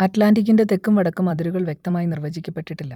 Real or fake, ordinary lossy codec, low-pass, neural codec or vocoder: fake; none; 19.8 kHz; vocoder, 44.1 kHz, 128 mel bands every 256 samples, BigVGAN v2